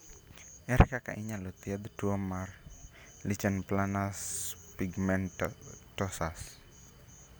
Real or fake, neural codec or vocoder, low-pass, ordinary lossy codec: real; none; none; none